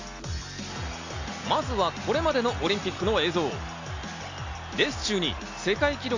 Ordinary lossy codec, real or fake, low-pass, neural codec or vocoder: AAC, 48 kbps; real; 7.2 kHz; none